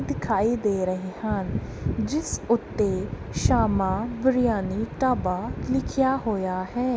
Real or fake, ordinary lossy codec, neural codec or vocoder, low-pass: real; none; none; none